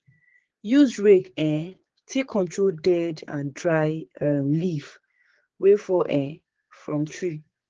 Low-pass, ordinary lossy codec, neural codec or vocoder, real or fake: 7.2 kHz; Opus, 16 kbps; codec, 16 kHz, 4 kbps, X-Codec, HuBERT features, trained on general audio; fake